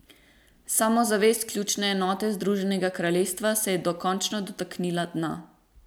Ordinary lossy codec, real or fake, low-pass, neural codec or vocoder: none; real; none; none